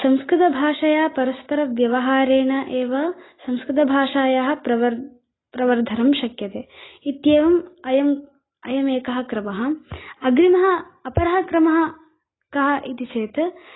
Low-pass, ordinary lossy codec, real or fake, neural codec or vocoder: 7.2 kHz; AAC, 16 kbps; real; none